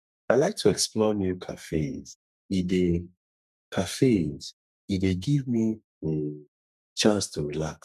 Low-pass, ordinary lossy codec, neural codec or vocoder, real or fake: 14.4 kHz; none; codec, 32 kHz, 1.9 kbps, SNAC; fake